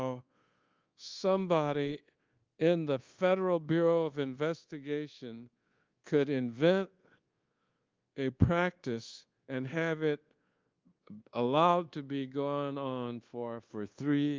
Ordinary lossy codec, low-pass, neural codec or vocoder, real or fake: Opus, 32 kbps; 7.2 kHz; codec, 24 kHz, 1.2 kbps, DualCodec; fake